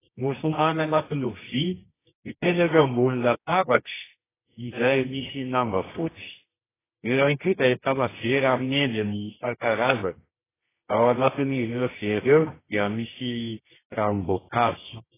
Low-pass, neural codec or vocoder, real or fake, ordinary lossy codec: 3.6 kHz; codec, 24 kHz, 0.9 kbps, WavTokenizer, medium music audio release; fake; AAC, 16 kbps